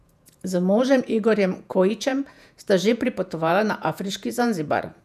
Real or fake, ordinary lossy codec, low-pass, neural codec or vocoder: fake; none; 14.4 kHz; vocoder, 48 kHz, 128 mel bands, Vocos